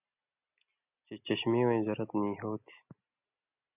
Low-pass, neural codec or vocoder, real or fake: 3.6 kHz; none; real